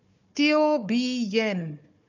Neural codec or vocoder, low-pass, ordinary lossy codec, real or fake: codec, 16 kHz, 4 kbps, FunCodec, trained on Chinese and English, 50 frames a second; 7.2 kHz; none; fake